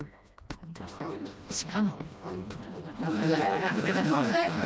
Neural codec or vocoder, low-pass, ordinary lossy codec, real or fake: codec, 16 kHz, 1 kbps, FreqCodec, smaller model; none; none; fake